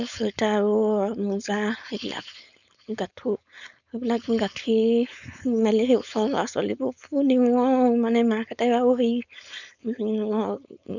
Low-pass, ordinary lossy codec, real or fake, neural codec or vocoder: 7.2 kHz; none; fake; codec, 16 kHz, 4.8 kbps, FACodec